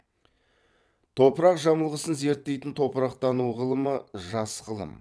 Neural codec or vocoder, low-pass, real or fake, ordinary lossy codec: vocoder, 22.05 kHz, 80 mel bands, WaveNeXt; none; fake; none